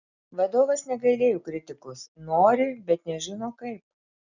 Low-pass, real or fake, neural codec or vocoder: 7.2 kHz; real; none